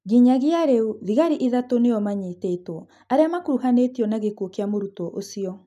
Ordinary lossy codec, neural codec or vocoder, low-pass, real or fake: none; none; 14.4 kHz; real